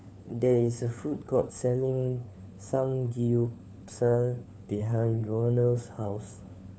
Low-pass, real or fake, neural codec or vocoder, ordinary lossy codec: none; fake; codec, 16 kHz, 4 kbps, FunCodec, trained on LibriTTS, 50 frames a second; none